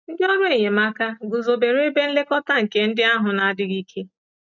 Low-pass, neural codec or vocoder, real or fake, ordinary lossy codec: 7.2 kHz; none; real; none